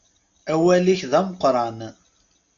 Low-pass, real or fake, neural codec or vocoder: 7.2 kHz; real; none